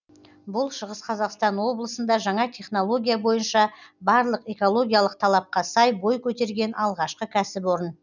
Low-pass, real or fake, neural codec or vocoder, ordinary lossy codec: 7.2 kHz; real; none; none